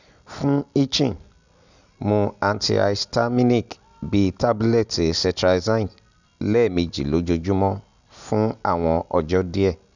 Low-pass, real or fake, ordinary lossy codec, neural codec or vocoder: 7.2 kHz; real; none; none